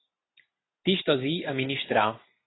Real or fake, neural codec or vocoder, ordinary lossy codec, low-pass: real; none; AAC, 16 kbps; 7.2 kHz